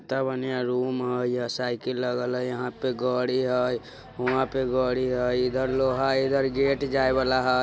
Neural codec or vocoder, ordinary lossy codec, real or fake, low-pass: none; none; real; none